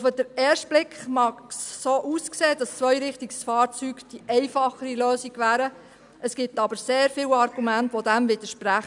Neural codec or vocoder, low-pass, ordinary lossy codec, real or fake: none; 10.8 kHz; none; real